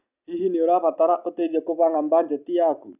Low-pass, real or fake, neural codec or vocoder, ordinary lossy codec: 3.6 kHz; real; none; none